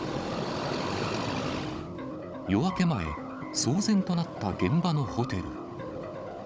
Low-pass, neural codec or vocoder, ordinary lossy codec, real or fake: none; codec, 16 kHz, 16 kbps, FunCodec, trained on Chinese and English, 50 frames a second; none; fake